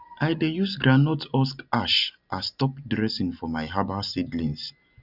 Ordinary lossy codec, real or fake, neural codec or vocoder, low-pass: none; real; none; 5.4 kHz